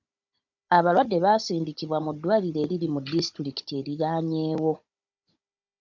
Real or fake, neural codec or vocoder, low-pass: fake; codec, 16 kHz, 16 kbps, FunCodec, trained on Chinese and English, 50 frames a second; 7.2 kHz